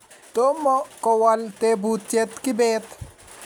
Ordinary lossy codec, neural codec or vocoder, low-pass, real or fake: none; none; none; real